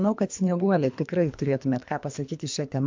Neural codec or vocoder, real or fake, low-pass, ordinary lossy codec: codec, 24 kHz, 3 kbps, HILCodec; fake; 7.2 kHz; MP3, 64 kbps